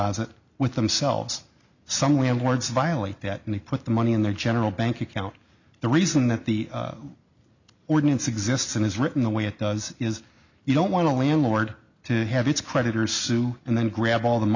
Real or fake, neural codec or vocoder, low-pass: real; none; 7.2 kHz